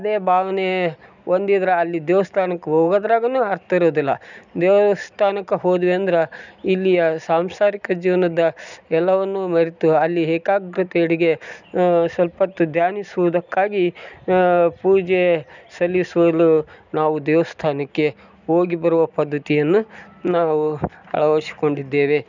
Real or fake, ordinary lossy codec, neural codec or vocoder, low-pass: real; none; none; 7.2 kHz